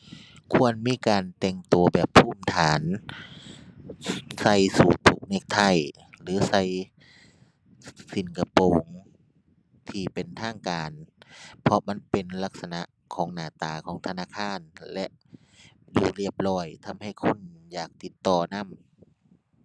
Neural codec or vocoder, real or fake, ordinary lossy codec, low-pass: none; real; none; none